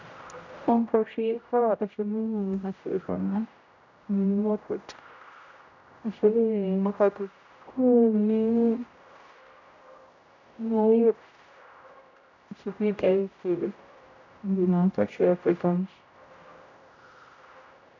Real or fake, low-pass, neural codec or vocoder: fake; 7.2 kHz; codec, 16 kHz, 0.5 kbps, X-Codec, HuBERT features, trained on general audio